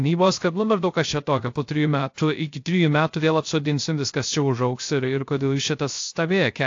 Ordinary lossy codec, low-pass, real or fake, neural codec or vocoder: AAC, 48 kbps; 7.2 kHz; fake; codec, 16 kHz, 0.3 kbps, FocalCodec